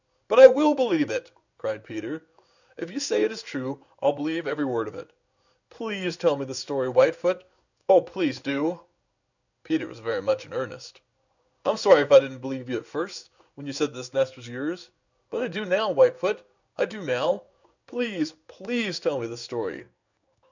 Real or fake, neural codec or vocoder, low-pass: fake; codec, 16 kHz in and 24 kHz out, 1 kbps, XY-Tokenizer; 7.2 kHz